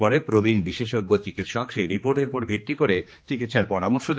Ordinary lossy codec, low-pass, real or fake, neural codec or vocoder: none; none; fake; codec, 16 kHz, 2 kbps, X-Codec, HuBERT features, trained on general audio